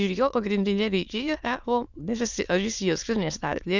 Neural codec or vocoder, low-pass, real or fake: autoencoder, 22.05 kHz, a latent of 192 numbers a frame, VITS, trained on many speakers; 7.2 kHz; fake